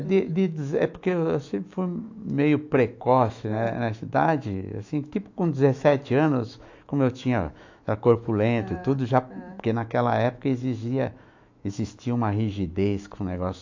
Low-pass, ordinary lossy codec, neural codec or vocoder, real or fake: 7.2 kHz; AAC, 48 kbps; autoencoder, 48 kHz, 128 numbers a frame, DAC-VAE, trained on Japanese speech; fake